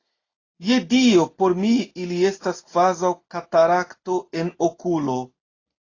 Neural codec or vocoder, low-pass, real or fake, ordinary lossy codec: none; 7.2 kHz; real; AAC, 32 kbps